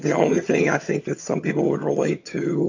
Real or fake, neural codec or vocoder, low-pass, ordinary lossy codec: fake; vocoder, 22.05 kHz, 80 mel bands, HiFi-GAN; 7.2 kHz; AAC, 48 kbps